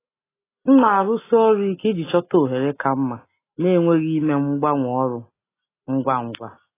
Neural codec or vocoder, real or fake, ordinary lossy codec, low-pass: none; real; AAC, 16 kbps; 3.6 kHz